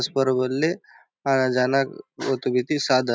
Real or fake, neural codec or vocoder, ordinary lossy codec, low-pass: real; none; none; none